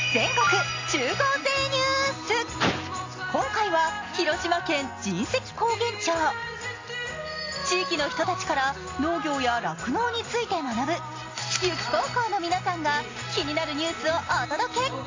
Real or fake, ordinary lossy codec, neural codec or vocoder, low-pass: real; AAC, 32 kbps; none; 7.2 kHz